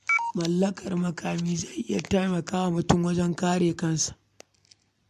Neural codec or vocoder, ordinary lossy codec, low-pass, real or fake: none; MP3, 64 kbps; 14.4 kHz; real